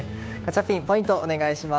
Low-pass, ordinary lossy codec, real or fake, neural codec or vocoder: none; none; fake; codec, 16 kHz, 6 kbps, DAC